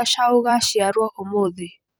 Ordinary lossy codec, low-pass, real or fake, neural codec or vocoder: none; none; fake; vocoder, 44.1 kHz, 128 mel bands every 256 samples, BigVGAN v2